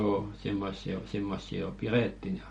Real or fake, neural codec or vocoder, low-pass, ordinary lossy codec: real; none; 19.8 kHz; MP3, 48 kbps